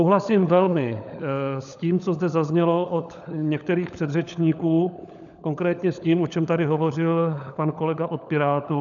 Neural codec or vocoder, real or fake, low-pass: codec, 16 kHz, 16 kbps, FunCodec, trained on LibriTTS, 50 frames a second; fake; 7.2 kHz